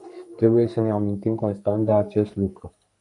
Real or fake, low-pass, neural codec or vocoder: fake; 10.8 kHz; codec, 44.1 kHz, 2.6 kbps, SNAC